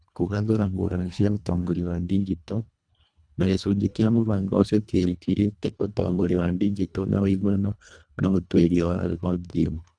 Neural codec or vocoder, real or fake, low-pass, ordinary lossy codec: codec, 24 kHz, 1.5 kbps, HILCodec; fake; 9.9 kHz; none